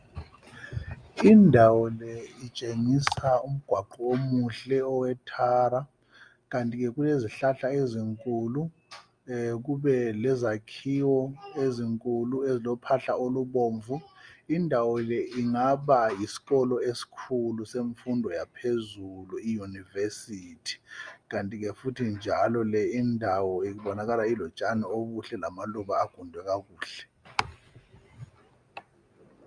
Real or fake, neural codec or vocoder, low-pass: real; none; 9.9 kHz